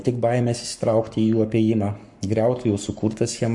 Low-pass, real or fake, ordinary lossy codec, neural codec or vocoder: 10.8 kHz; fake; MP3, 64 kbps; codec, 44.1 kHz, 7.8 kbps, Pupu-Codec